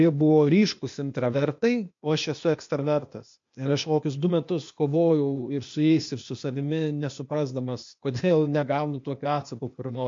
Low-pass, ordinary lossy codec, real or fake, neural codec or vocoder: 7.2 kHz; MP3, 48 kbps; fake; codec, 16 kHz, 0.8 kbps, ZipCodec